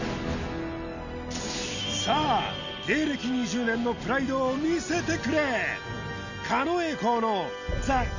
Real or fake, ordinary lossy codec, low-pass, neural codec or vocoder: real; none; 7.2 kHz; none